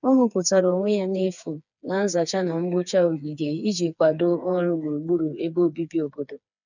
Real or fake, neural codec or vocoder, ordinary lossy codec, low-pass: fake; codec, 16 kHz, 4 kbps, FreqCodec, smaller model; none; 7.2 kHz